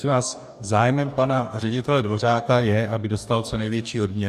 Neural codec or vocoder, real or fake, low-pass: codec, 44.1 kHz, 2.6 kbps, DAC; fake; 14.4 kHz